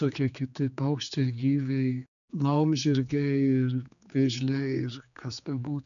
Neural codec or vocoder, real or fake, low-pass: codec, 16 kHz, 4 kbps, X-Codec, HuBERT features, trained on general audio; fake; 7.2 kHz